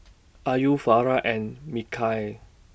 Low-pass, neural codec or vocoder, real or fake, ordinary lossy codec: none; none; real; none